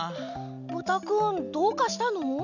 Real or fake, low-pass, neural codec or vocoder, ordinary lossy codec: real; 7.2 kHz; none; none